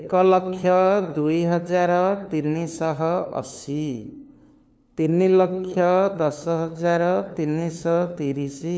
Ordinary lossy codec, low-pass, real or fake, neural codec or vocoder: none; none; fake; codec, 16 kHz, 2 kbps, FunCodec, trained on LibriTTS, 25 frames a second